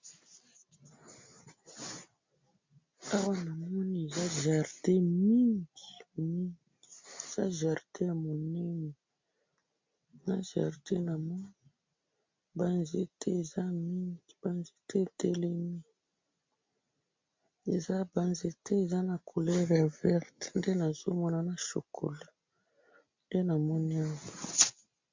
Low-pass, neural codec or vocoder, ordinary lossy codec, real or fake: 7.2 kHz; none; MP3, 64 kbps; real